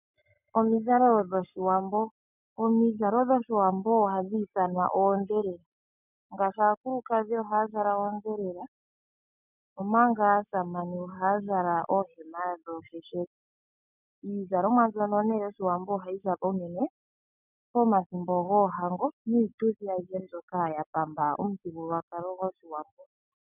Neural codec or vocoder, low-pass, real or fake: none; 3.6 kHz; real